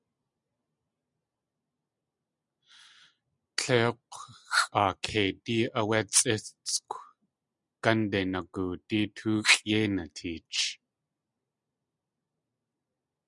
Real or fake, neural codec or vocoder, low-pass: real; none; 10.8 kHz